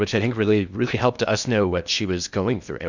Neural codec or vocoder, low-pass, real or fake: codec, 16 kHz in and 24 kHz out, 0.8 kbps, FocalCodec, streaming, 65536 codes; 7.2 kHz; fake